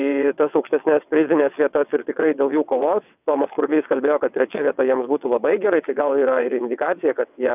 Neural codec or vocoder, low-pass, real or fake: vocoder, 22.05 kHz, 80 mel bands, WaveNeXt; 3.6 kHz; fake